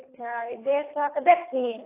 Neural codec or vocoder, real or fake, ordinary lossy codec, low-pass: codec, 24 kHz, 3 kbps, HILCodec; fake; AAC, 24 kbps; 3.6 kHz